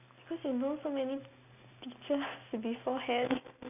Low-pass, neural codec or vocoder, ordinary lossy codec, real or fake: 3.6 kHz; none; none; real